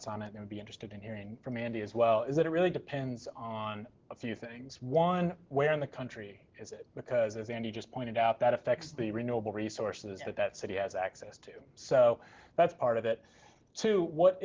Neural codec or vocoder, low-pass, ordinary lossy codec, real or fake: none; 7.2 kHz; Opus, 16 kbps; real